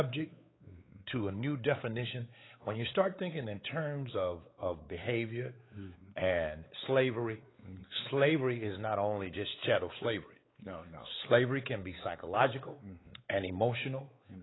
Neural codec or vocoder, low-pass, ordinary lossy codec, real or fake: codec, 16 kHz, 4 kbps, X-Codec, WavLM features, trained on Multilingual LibriSpeech; 7.2 kHz; AAC, 16 kbps; fake